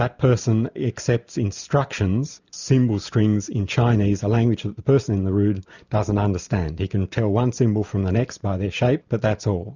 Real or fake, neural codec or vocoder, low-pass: real; none; 7.2 kHz